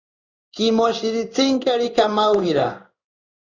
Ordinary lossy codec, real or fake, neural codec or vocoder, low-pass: Opus, 64 kbps; fake; codec, 16 kHz in and 24 kHz out, 1 kbps, XY-Tokenizer; 7.2 kHz